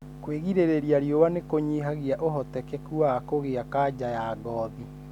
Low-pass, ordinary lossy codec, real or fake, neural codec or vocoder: 19.8 kHz; none; real; none